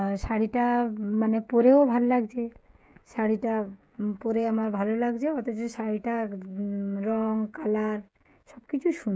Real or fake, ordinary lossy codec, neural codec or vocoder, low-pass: fake; none; codec, 16 kHz, 16 kbps, FreqCodec, smaller model; none